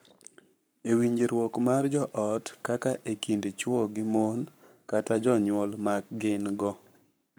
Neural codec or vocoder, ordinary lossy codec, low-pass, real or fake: codec, 44.1 kHz, 7.8 kbps, Pupu-Codec; none; none; fake